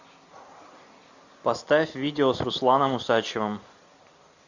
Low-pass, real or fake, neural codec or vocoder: 7.2 kHz; real; none